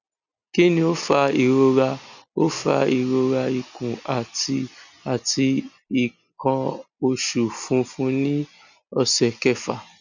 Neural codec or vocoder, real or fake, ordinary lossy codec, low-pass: none; real; none; 7.2 kHz